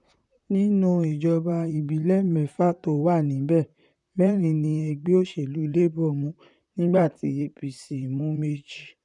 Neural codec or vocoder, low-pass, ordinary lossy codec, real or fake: vocoder, 44.1 kHz, 128 mel bands, Pupu-Vocoder; 10.8 kHz; none; fake